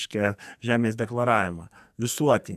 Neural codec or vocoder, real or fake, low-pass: codec, 44.1 kHz, 2.6 kbps, SNAC; fake; 14.4 kHz